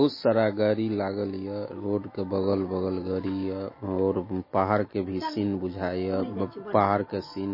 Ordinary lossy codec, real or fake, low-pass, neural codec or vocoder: MP3, 24 kbps; real; 5.4 kHz; none